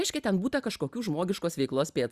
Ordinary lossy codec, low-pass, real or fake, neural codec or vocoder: Opus, 64 kbps; 14.4 kHz; real; none